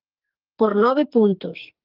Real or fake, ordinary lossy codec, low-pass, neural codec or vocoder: fake; Opus, 24 kbps; 5.4 kHz; codec, 44.1 kHz, 2.6 kbps, SNAC